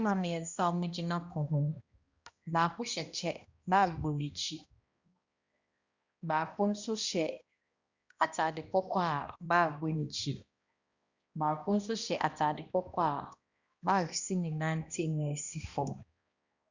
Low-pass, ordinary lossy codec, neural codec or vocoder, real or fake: 7.2 kHz; Opus, 64 kbps; codec, 16 kHz, 1 kbps, X-Codec, HuBERT features, trained on balanced general audio; fake